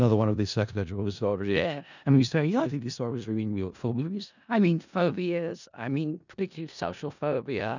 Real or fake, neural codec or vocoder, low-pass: fake; codec, 16 kHz in and 24 kHz out, 0.4 kbps, LongCat-Audio-Codec, four codebook decoder; 7.2 kHz